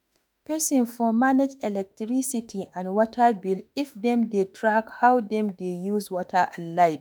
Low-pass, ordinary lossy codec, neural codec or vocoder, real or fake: none; none; autoencoder, 48 kHz, 32 numbers a frame, DAC-VAE, trained on Japanese speech; fake